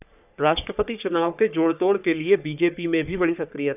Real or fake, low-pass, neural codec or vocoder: fake; 3.6 kHz; codec, 44.1 kHz, 3.4 kbps, Pupu-Codec